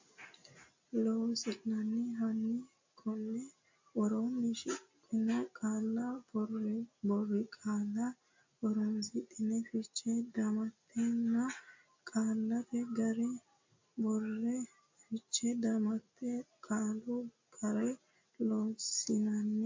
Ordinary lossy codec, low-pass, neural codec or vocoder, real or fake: MP3, 48 kbps; 7.2 kHz; none; real